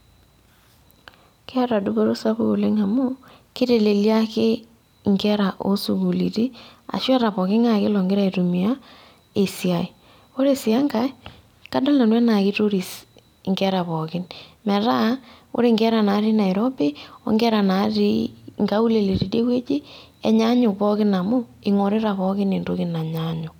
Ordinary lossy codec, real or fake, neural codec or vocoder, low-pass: none; fake; vocoder, 44.1 kHz, 128 mel bands every 256 samples, BigVGAN v2; 19.8 kHz